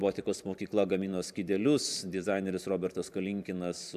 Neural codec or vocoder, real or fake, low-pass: vocoder, 44.1 kHz, 128 mel bands every 512 samples, BigVGAN v2; fake; 14.4 kHz